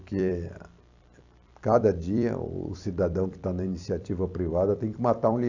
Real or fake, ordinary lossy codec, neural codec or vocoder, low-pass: fake; AAC, 48 kbps; vocoder, 44.1 kHz, 128 mel bands every 256 samples, BigVGAN v2; 7.2 kHz